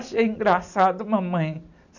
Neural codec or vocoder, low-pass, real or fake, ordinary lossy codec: none; 7.2 kHz; real; none